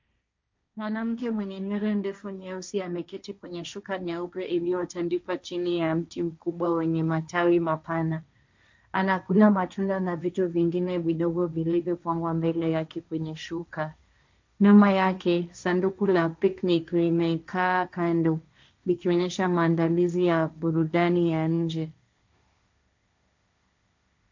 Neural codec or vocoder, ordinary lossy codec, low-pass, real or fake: codec, 16 kHz, 1.1 kbps, Voila-Tokenizer; MP3, 64 kbps; 7.2 kHz; fake